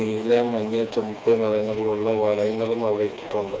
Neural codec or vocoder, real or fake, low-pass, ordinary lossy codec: codec, 16 kHz, 2 kbps, FreqCodec, smaller model; fake; none; none